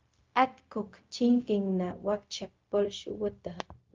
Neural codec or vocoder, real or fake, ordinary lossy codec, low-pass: codec, 16 kHz, 0.4 kbps, LongCat-Audio-Codec; fake; Opus, 24 kbps; 7.2 kHz